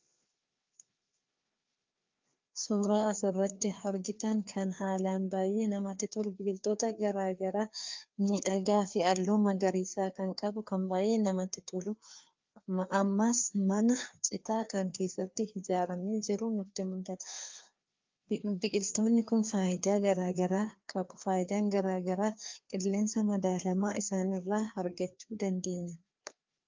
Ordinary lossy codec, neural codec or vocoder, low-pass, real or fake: Opus, 24 kbps; codec, 16 kHz, 2 kbps, FreqCodec, larger model; 7.2 kHz; fake